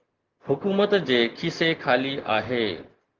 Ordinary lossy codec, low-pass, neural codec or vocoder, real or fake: Opus, 16 kbps; 7.2 kHz; none; real